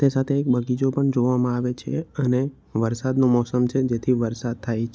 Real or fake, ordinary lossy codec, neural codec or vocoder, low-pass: real; none; none; none